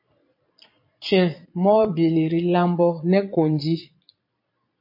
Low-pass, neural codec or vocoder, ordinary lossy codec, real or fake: 5.4 kHz; vocoder, 22.05 kHz, 80 mel bands, Vocos; MP3, 32 kbps; fake